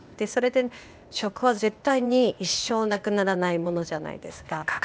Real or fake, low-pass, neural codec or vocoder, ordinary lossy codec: fake; none; codec, 16 kHz, 0.8 kbps, ZipCodec; none